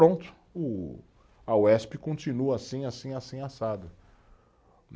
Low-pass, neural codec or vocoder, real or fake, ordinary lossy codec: none; none; real; none